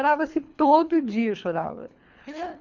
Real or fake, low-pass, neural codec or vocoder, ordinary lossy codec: fake; 7.2 kHz; codec, 24 kHz, 3 kbps, HILCodec; none